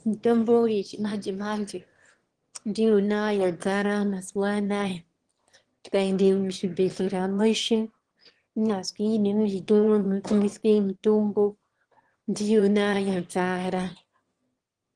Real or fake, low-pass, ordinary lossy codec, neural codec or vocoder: fake; 9.9 kHz; Opus, 16 kbps; autoencoder, 22.05 kHz, a latent of 192 numbers a frame, VITS, trained on one speaker